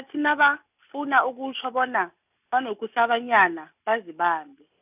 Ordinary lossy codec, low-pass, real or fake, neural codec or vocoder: none; 3.6 kHz; real; none